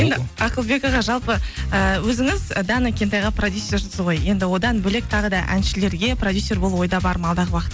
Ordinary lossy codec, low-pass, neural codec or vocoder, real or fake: none; none; none; real